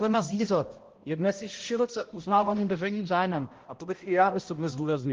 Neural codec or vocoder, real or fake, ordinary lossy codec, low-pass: codec, 16 kHz, 0.5 kbps, X-Codec, HuBERT features, trained on balanced general audio; fake; Opus, 16 kbps; 7.2 kHz